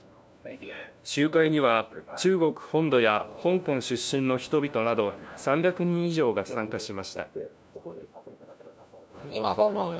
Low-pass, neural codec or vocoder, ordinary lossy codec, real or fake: none; codec, 16 kHz, 1 kbps, FunCodec, trained on LibriTTS, 50 frames a second; none; fake